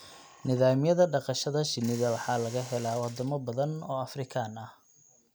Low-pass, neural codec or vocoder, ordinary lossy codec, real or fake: none; none; none; real